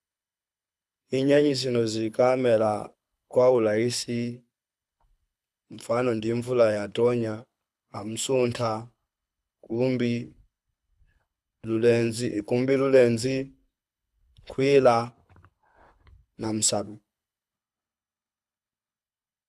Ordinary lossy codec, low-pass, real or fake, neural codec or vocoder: none; none; fake; codec, 24 kHz, 6 kbps, HILCodec